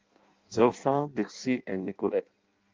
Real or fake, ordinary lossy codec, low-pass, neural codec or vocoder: fake; Opus, 32 kbps; 7.2 kHz; codec, 16 kHz in and 24 kHz out, 0.6 kbps, FireRedTTS-2 codec